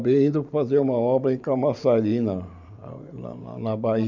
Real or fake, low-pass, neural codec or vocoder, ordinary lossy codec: fake; 7.2 kHz; vocoder, 44.1 kHz, 128 mel bands every 512 samples, BigVGAN v2; none